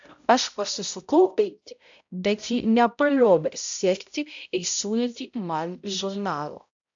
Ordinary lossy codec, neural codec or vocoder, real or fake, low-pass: AAC, 64 kbps; codec, 16 kHz, 0.5 kbps, X-Codec, HuBERT features, trained on balanced general audio; fake; 7.2 kHz